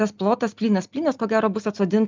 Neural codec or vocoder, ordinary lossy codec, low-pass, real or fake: none; Opus, 24 kbps; 7.2 kHz; real